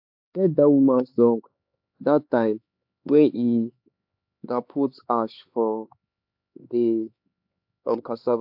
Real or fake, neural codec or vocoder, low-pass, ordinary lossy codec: fake; codec, 16 kHz, 4 kbps, X-Codec, HuBERT features, trained on LibriSpeech; 5.4 kHz; AAC, 48 kbps